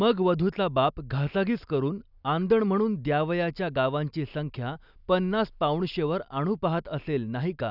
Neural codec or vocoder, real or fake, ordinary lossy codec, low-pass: none; real; none; 5.4 kHz